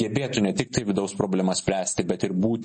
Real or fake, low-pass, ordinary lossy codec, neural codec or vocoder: real; 10.8 kHz; MP3, 32 kbps; none